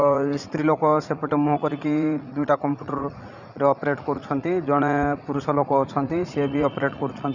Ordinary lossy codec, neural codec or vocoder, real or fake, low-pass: none; vocoder, 44.1 kHz, 80 mel bands, Vocos; fake; 7.2 kHz